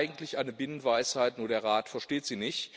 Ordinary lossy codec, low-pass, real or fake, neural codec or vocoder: none; none; real; none